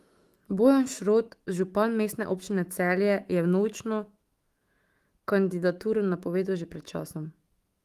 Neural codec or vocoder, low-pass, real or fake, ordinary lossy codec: autoencoder, 48 kHz, 128 numbers a frame, DAC-VAE, trained on Japanese speech; 14.4 kHz; fake; Opus, 24 kbps